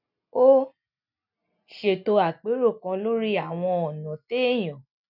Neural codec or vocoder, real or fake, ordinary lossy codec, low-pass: none; real; none; 5.4 kHz